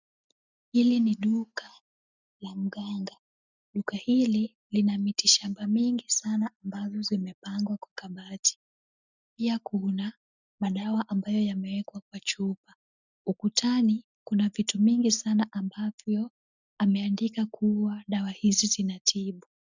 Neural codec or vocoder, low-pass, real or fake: none; 7.2 kHz; real